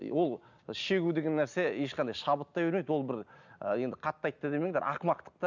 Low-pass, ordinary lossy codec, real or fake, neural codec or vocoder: 7.2 kHz; none; real; none